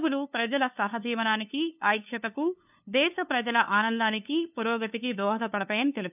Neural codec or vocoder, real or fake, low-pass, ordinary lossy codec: codec, 16 kHz, 2 kbps, FunCodec, trained on LibriTTS, 25 frames a second; fake; 3.6 kHz; none